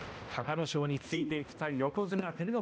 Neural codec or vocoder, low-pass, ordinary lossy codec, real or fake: codec, 16 kHz, 1 kbps, X-Codec, HuBERT features, trained on balanced general audio; none; none; fake